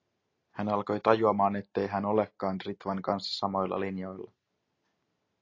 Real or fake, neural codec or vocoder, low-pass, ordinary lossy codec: real; none; 7.2 kHz; AAC, 32 kbps